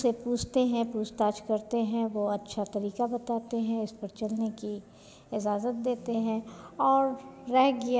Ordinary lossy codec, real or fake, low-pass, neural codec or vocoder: none; real; none; none